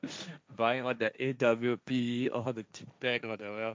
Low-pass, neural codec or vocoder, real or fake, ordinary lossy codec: none; codec, 16 kHz, 1.1 kbps, Voila-Tokenizer; fake; none